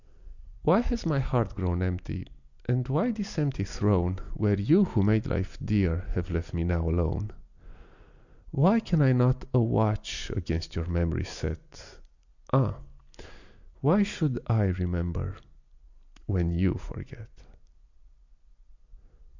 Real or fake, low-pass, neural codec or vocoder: real; 7.2 kHz; none